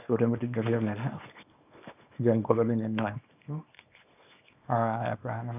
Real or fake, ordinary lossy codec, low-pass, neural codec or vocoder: fake; none; 3.6 kHz; codec, 24 kHz, 0.9 kbps, WavTokenizer, small release